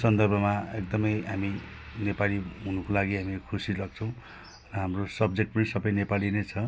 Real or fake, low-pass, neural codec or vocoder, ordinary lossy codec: real; none; none; none